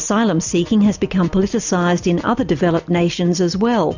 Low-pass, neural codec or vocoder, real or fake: 7.2 kHz; none; real